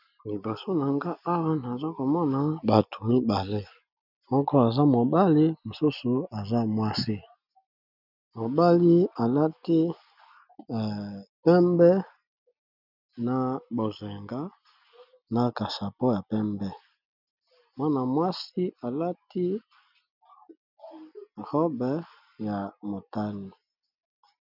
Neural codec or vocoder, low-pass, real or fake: none; 5.4 kHz; real